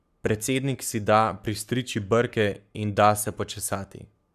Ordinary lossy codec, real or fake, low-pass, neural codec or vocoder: none; fake; 14.4 kHz; codec, 44.1 kHz, 7.8 kbps, Pupu-Codec